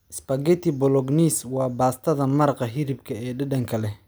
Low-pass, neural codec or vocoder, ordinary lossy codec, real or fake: none; none; none; real